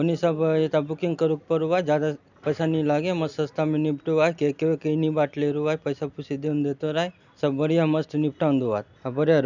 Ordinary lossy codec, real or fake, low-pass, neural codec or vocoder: none; real; 7.2 kHz; none